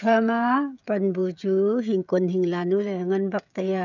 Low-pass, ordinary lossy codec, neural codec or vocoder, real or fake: 7.2 kHz; none; codec, 16 kHz, 16 kbps, FreqCodec, smaller model; fake